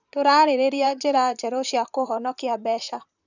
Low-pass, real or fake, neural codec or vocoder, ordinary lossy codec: 7.2 kHz; fake; vocoder, 44.1 kHz, 128 mel bands, Pupu-Vocoder; none